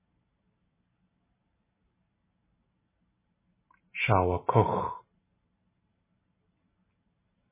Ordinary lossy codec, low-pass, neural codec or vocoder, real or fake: MP3, 16 kbps; 3.6 kHz; none; real